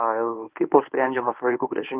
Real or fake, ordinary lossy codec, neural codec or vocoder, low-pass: fake; Opus, 24 kbps; codec, 24 kHz, 0.9 kbps, WavTokenizer, medium speech release version 1; 3.6 kHz